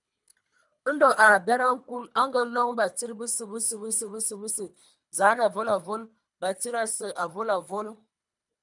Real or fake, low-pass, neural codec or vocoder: fake; 10.8 kHz; codec, 24 kHz, 3 kbps, HILCodec